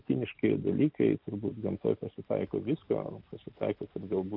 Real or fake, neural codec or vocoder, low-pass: real; none; 5.4 kHz